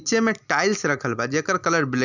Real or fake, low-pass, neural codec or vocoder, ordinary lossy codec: real; 7.2 kHz; none; none